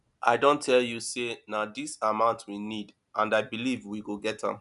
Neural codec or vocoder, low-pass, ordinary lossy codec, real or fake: none; 10.8 kHz; none; real